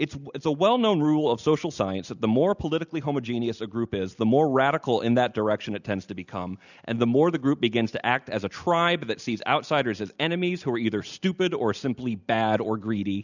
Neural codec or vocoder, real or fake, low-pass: none; real; 7.2 kHz